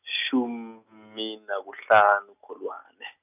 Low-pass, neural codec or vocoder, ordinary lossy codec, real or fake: 3.6 kHz; none; none; real